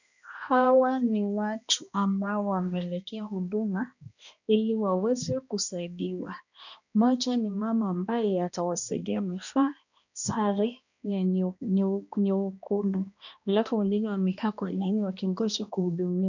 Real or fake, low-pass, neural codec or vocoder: fake; 7.2 kHz; codec, 16 kHz, 1 kbps, X-Codec, HuBERT features, trained on balanced general audio